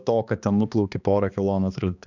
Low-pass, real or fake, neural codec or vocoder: 7.2 kHz; fake; codec, 16 kHz, 2 kbps, X-Codec, HuBERT features, trained on balanced general audio